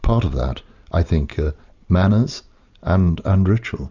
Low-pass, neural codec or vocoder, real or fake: 7.2 kHz; none; real